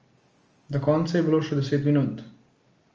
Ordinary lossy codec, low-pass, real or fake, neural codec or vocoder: Opus, 24 kbps; 7.2 kHz; real; none